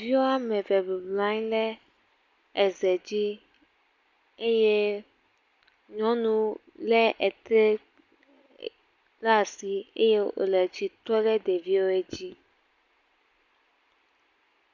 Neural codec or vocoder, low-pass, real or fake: none; 7.2 kHz; real